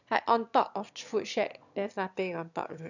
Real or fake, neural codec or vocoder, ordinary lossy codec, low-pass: fake; autoencoder, 22.05 kHz, a latent of 192 numbers a frame, VITS, trained on one speaker; none; 7.2 kHz